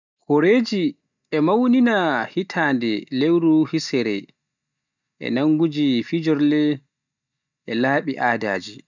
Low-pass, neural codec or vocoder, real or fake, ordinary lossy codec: 7.2 kHz; none; real; none